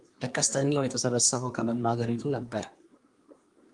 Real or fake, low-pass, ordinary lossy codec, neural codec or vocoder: fake; 10.8 kHz; Opus, 32 kbps; codec, 24 kHz, 1 kbps, SNAC